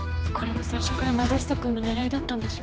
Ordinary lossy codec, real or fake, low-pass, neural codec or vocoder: none; fake; none; codec, 16 kHz, 2 kbps, X-Codec, HuBERT features, trained on general audio